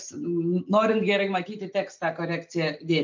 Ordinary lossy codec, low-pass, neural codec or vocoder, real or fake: MP3, 64 kbps; 7.2 kHz; none; real